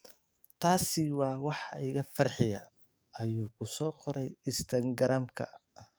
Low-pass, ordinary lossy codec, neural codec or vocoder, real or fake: none; none; codec, 44.1 kHz, 7.8 kbps, DAC; fake